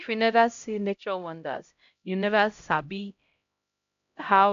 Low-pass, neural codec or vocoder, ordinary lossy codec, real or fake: 7.2 kHz; codec, 16 kHz, 0.5 kbps, X-Codec, HuBERT features, trained on LibriSpeech; AAC, 64 kbps; fake